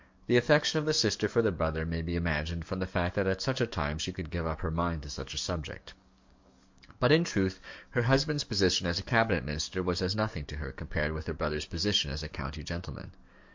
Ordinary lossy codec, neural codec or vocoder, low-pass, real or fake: MP3, 48 kbps; codec, 44.1 kHz, 7.8 kbps, DAC; 7.2 kHz; fake